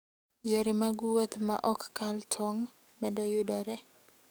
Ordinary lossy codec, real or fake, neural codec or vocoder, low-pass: none; fake; codec, 44.1 kHz, 7.8 kbps, DAC; none